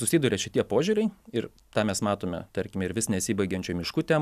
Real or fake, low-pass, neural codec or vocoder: real; 14.4 kHz; none